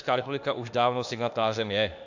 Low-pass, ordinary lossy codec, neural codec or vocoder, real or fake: 7.2 kHz; AAC, 48 kbps; autoencoder, 48 kHz, 32 numbers a frame, DAC-VAE, trained on Japanese speech; fake